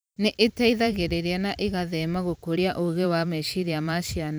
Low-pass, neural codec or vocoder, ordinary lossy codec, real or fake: none; vocoder, 44.1 kHz, 128 mel bands every 512 samples, BigVGAN v2; none; fake